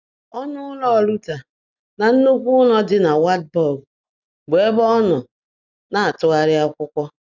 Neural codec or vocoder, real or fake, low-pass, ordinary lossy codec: none; real; 7.2 kHz; none